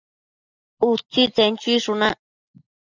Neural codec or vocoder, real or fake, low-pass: none; real; 7.2 kHz